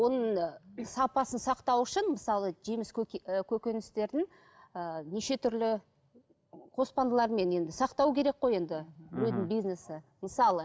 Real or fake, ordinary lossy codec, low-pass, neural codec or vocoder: real; none; none; none